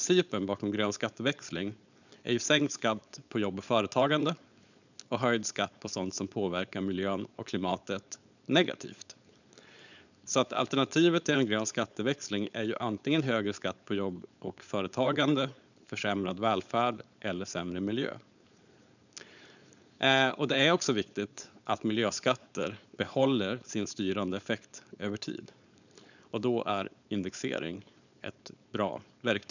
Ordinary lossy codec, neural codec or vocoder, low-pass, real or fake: none; codec, 16 kHz, 4.8 kbps, FACodec; 7.2 kHz; fake